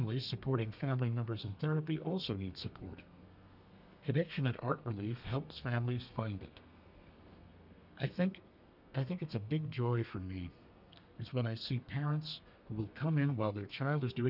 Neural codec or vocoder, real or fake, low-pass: codec, 32 kHz, 1.9 kbps, SNAC; fake; 5.4 kHz